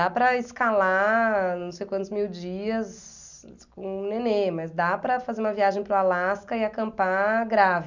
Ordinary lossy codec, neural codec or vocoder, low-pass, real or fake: none; none; 7.2 kHz; real